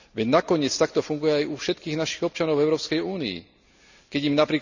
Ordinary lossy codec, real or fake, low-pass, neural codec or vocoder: none; real; 7.2 kHz; none